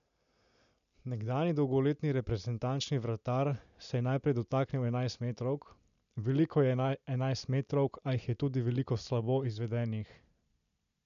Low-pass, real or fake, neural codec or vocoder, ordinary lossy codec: 7.2 kHz; real; none; none